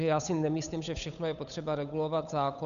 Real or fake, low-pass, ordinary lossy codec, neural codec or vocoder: fake; 7.2 kHz; MP3, 96 kbps; codec, 16 kHz, 8 kbps, FunCodec, trained on Chinese and English, 25 frames a second